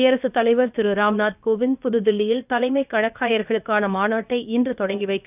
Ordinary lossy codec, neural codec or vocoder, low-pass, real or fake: none; codec, 16 kHz, about 1 kbps, DyCAST, with the encoder's durations; 3.6 kHz; fake